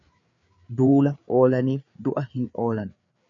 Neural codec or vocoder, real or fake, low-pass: codec, 16 kHz, 4 kbps, FreqCodec, larger model; fake; 7.2 kHz